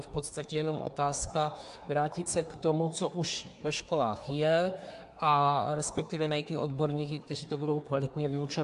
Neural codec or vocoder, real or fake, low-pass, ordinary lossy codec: codec, 24 kHz, 1 kbps, SNAC; fake; 10.8 kHz; AAC, 64 kbps